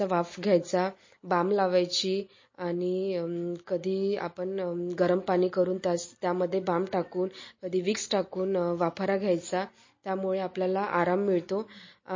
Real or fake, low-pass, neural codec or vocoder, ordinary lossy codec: real; 7.2 kHz; none; MP3, 32 kbps